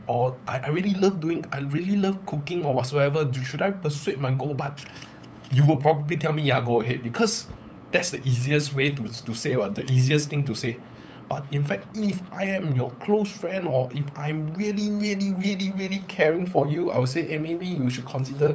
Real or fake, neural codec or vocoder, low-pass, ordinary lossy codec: fake; codec, 16 kHz, 8 kbps, FunCodec, trained on LibriTTS, 25 frames a second; none; none